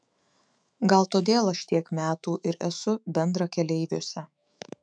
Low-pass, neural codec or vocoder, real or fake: 9.9 kHz; autoencoder, 48 kHz, 128 numbers a frame, DAC-VAE, trained on Japanese speech; fake